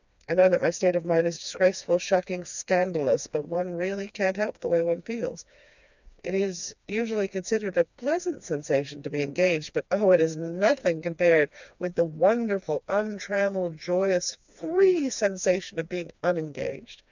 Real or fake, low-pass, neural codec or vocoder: fake; 7.2 kHz; codec, 16 kHz, 2 kbps, FreqCodec, smaller model